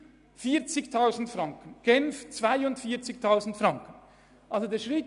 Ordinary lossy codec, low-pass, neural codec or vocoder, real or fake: none; 10.8 kHz; none; real